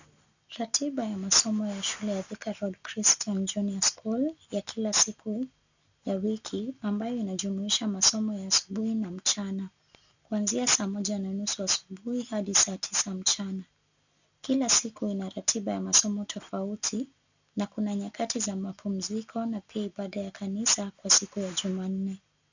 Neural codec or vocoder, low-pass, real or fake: none; 7.2 kHz; real